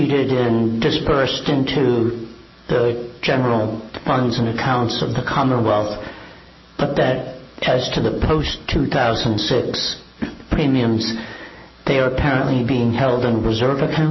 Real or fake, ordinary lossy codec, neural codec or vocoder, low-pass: real; MP3, 24 kbps; none; 7.2 kHz